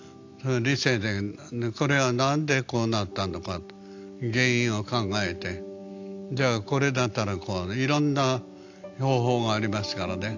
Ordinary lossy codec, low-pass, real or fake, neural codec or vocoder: AAC, 48 kbps; 7.2 kHz; real; none